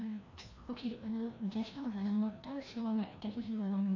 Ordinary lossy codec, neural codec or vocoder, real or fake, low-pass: AAC, 48 kbps; codec, 16 kHz, 1 kbps, FreqCodec, larger model; fake; 7.2 kHz